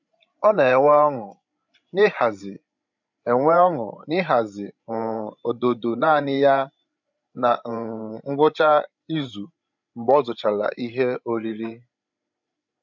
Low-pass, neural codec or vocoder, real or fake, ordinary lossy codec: 7.2 kHz; codec, 16 kHz, 16 kbps, FreqCodec, larger model; fake; none